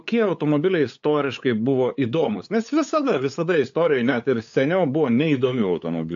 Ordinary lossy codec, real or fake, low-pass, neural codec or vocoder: AAC, 64 kbps; fake; 7.2 kHz; codec, 16 kHz, 8 kbps, FunCodec, trained on LibriTTS, 25 frames a second